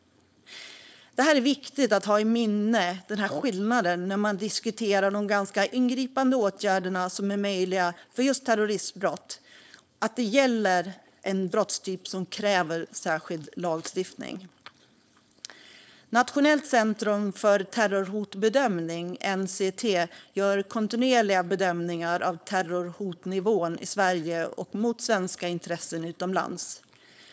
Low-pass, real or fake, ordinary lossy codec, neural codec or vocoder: none; fake; none; codec, 16 kHz, 4.8 kbps, FACodec